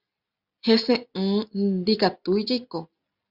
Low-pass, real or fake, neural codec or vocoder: 5.4 kHz; real; none